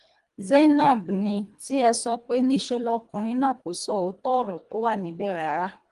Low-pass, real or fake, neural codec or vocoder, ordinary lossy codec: 10.8 kHz; fake; codec, 24 kHz, 1.5 kbps, HILCodec; Opus, 24 kbps